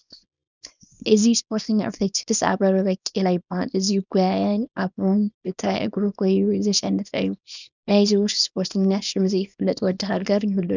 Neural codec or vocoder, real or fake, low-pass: codec, 24 kHz, 0.9 kbps, WavTokenizer, small release; fake; 7.2 kHz